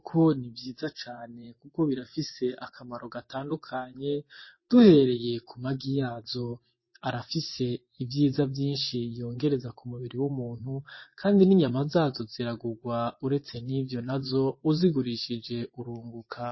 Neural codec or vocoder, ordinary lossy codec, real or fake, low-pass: vocoder, 24 kHz, 100 mel bands, Vocos; MP3, 24 kbps; fake; 7.2 kHz